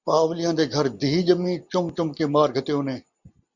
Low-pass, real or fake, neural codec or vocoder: 7.2 kHz; real; none